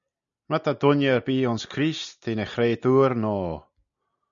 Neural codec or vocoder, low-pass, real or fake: none; 7.2 kHz; real